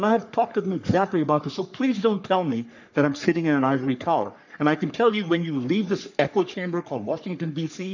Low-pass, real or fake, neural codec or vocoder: 7.2 kHz; fake; codec, 44.1 kHz, 3.4 kbps, Pupu-Codec